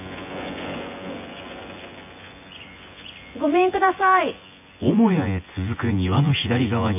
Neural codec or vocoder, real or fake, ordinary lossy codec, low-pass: vocoder, 24 kHz, 100 mel bands, Vocos; fake; none; 3.6 kHz